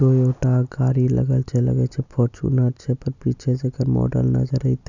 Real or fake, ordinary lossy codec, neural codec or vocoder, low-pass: real; none; none; 7.2 kHz